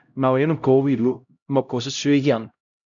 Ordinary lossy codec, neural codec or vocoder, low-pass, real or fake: MP3, 96 kbps; codec, 16 kHz, 0.5 kbps, X-Codec, HuBERT features, trained on LibriSpeech; 7.2 kHz; fake